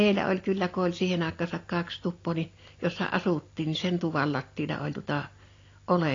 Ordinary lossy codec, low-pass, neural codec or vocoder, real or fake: AAC, 32 kbps; 7.2 kHz; none; real